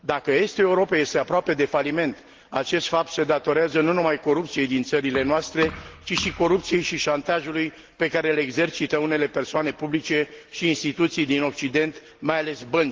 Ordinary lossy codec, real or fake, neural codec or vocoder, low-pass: Opus, 16 kbps; real; none; 7.2 kHz